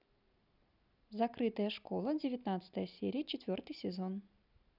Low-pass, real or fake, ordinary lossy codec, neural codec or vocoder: 5.4 kHz; real; none; none